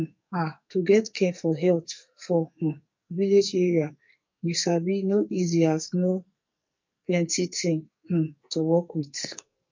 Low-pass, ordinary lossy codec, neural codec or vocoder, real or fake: 7.2 kHz; MP3, 48 kbps; codec, 44.1 kHz, 2.6 kbps, SNAC; fake